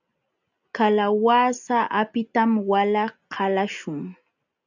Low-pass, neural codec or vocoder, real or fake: 7.2 kHz; none; real